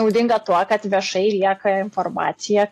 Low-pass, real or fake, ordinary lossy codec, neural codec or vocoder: 14.4 kHz; real; AAC, 64 kbps; none